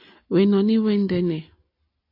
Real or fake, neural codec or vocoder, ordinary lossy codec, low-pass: real; none; AAC, 32 kbps; 5.4 kHz